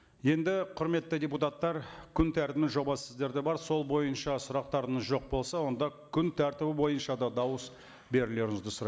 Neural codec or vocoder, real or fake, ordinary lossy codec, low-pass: none; real; none; none